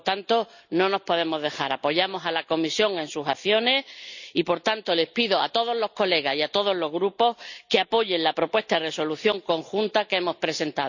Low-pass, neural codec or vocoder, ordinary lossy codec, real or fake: 7.2 kHz; none; none; real